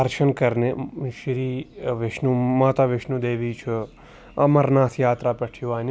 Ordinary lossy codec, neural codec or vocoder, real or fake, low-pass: none; none; real; none